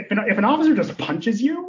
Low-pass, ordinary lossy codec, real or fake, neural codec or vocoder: 7.2 kHz; AAC, 48 kbps; real; none